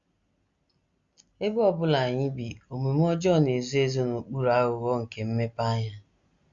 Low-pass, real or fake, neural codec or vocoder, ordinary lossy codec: 7.2 kHz; real; none; Opus, 64 kbps